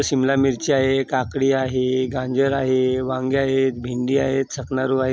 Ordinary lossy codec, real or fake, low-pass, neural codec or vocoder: none; real; none; none